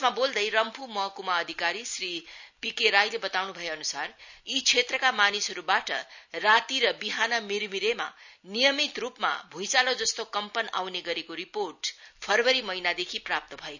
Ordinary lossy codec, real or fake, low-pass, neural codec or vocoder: none; real; 7.2 kHz; none